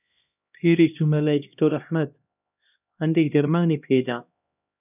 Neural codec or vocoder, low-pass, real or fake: codec, 16 kHz, 1 kbps, X-Codec, HuBERT features, trained on LibriSpeech; 3.6 kHz; fake